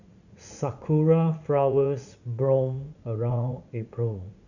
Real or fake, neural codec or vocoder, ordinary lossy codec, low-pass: fake; vocoder, 44.1 kHz, 80 mel bands, Vocos; none; 7.2 kHz